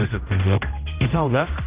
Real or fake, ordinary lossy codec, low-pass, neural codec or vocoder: fake; Opus, 32 kbps; 3.6 kHz; codec, 16 kHz, 0.5 kbps, X-Codec, HuBERT features, trained on general audio